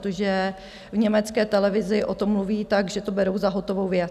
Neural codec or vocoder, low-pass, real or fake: none; 14.4 kHz; real